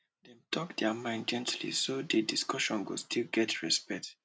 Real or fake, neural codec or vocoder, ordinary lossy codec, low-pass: real; none; none; none